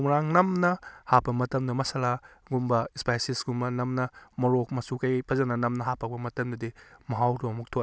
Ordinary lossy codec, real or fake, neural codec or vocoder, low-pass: none; real; none; none